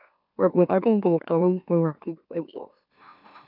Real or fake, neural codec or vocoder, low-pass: fake; autoencoder, 44.1 kHz, a latent of 192 numbers a frame, MeloTTS; 5.4 kHz